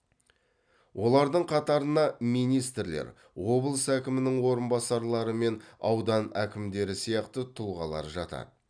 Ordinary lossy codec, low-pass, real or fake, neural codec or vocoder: none; 9.9 kHz; real; none